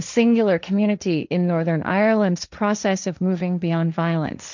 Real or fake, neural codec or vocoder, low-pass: fake; codec, 16 kHz, 1.1 kbps, Voila-Tokenizer; 7.2 kHz